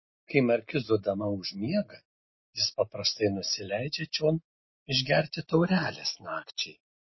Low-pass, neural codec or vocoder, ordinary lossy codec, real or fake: 7.2 kHz; none; MP3, 24 kbps; real